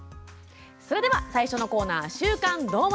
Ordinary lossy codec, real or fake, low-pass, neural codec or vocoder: none; real; none; none